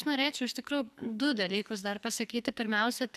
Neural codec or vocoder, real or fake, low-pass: codec, 44.1 kHz, 2.6 kbps, SNAC; fake; 14.4 kHz